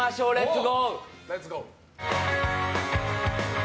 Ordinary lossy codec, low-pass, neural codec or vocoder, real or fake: none; none; none; real